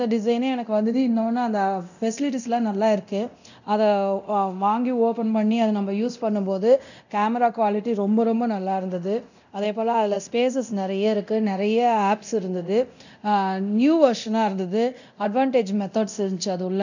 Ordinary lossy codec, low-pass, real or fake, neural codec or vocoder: none; 7.2 kHz; fake; codec, 24 kHz, 0.9 kbps, DualCodec